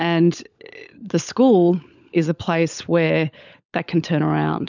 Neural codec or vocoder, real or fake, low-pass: codec, 16 kHz, 16 kbps, FunCodec, trained on LibriTTS, 50 frames a second; fake; 7.2 kHz